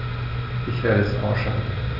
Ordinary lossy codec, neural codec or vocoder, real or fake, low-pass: none; none; real; 5.4 kHz